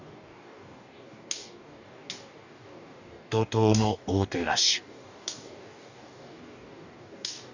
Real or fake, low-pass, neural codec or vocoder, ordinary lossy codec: fake; 7.2 kHz; codec, 44.1 kHz, 2.6 kbps, DAC; none